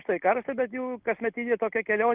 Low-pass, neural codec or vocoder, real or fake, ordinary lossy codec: 3.6 kHz; none; real; Opus, 32 kbps